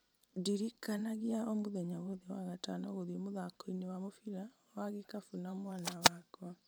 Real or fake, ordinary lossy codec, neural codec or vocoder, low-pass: fake; none; vocoder, 44.1 kHz, 128 mel bands every 512 samples, BigVGAN v2; none